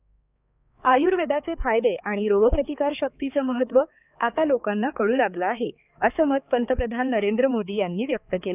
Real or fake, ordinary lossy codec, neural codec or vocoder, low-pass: fake; none; codec, 16 kHz, 4 kbps, X-Codec, HuBERT features, trained on balanced general audio; 3.6 kHz